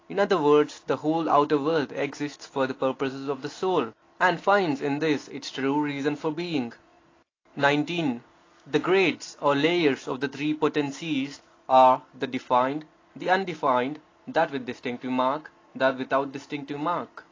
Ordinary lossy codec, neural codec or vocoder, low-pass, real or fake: AAC, 32 kbps; none; 7.2 kHz; real